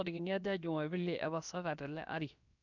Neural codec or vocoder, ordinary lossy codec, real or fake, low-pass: codec, 16 kHz, about 1 kbps, DyCAST, with the encoder's durations; none; fake; 7.2 kHz